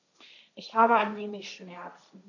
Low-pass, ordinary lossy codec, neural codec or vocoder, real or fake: 7.2 kHz; none; codec, 16 kHz, 1.1 kbps, Voila-Tokenizer; fake